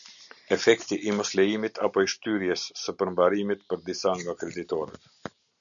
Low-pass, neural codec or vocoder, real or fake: 7.2 kHz; none; real